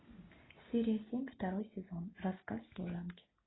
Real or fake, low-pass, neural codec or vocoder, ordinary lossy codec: real; 7.2 kHz; none; AAC, 16 kbps